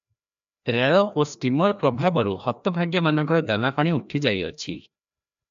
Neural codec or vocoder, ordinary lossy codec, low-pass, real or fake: codec, 16 kHz, 1 kbps, FreqCodec, larger model; AAC, 96 kbps; 7.2 kHz; fake